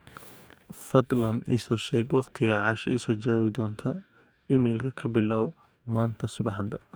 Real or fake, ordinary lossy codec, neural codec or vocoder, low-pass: fake; none; codec, 44.1 kHz, 2.6 kbps, DAC; none